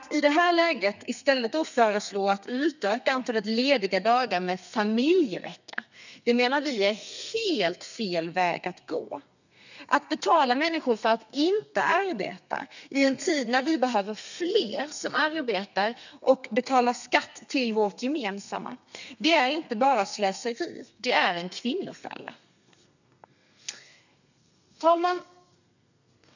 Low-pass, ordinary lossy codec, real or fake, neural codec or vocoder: 7.2 kHz; none; fake; codec, 32 kHz, 1.9 kbps, SNAC